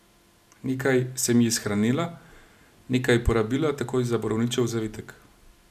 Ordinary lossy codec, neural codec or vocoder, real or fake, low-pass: none; none; real; 14.4 kHz